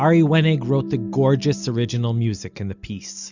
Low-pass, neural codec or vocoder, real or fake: 7.2 kHz; none; real